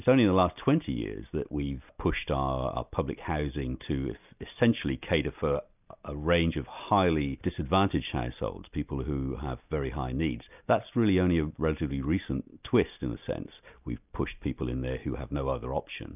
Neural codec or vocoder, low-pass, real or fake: none; 3.6 kHz; real